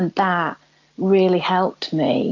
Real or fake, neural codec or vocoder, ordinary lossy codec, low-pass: real; none; AAC, 48 kbps; 7.2 kHz